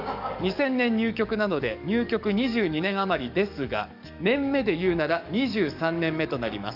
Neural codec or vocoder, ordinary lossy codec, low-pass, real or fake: codec, 44.1 kHz, 7.8 kbps, DAC; none; 5.4 kHz; fake